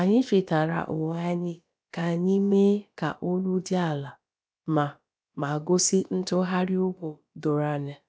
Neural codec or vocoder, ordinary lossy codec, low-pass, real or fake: codec, 16 kHz, about 1 kbps, DyCAST, with the encoder's durations; none; none; fake